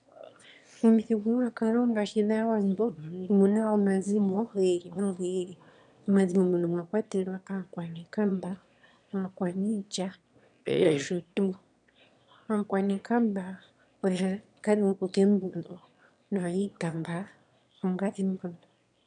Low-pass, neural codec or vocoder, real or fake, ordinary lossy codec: 9.9 kHz; autoencoder, 22.05 kHz, a latent of 192 numbers a frame, VITS, trained on one speaker; fake; MP3, 96 kbps